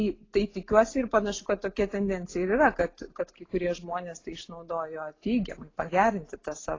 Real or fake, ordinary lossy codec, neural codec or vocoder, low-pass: real; AAC, 32 kbps; none; 7.2 kHz